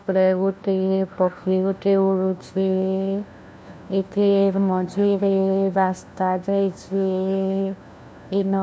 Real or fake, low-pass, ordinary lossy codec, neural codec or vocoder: fake; none; none; codec, 16 kHz, 1 kbps, FunCodec, trained on LibriTTS, 50 frames a second